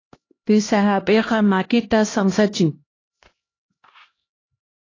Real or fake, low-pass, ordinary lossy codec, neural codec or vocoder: fake; 7.2 kHz; AAC, 32 kbps; codec, 16 kHz, 1 kbps, X-Codec, HuBERT features, trained on LibriSpeech